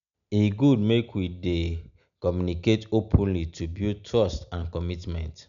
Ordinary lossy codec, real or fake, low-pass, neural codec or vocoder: none; real; 7.2 kHz; none